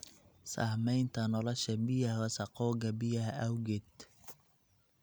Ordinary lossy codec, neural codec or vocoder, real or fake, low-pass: none; none; real; none